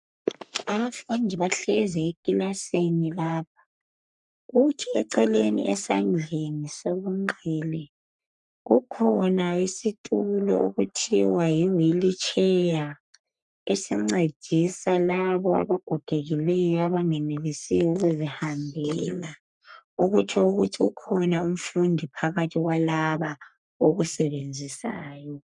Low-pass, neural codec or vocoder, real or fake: 10.8 kHz; codec, 44.1 kHz, 3.4 kbps, Pupu-Codec; fake